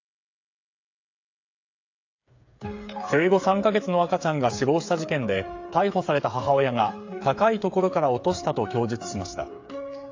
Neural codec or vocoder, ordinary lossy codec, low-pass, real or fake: codec, 16 kHz, 8 kbps, FreqCodec, smaller model; AAC, 48 kbps; 7.2 kHz; fake